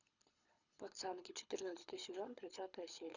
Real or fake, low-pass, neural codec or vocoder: fake; 7.2 kHz; codec, 24 kHz, 6 kbps, HILCodec